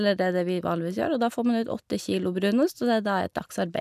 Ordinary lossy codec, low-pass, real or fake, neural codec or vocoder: none; 14.4 kHz; real; none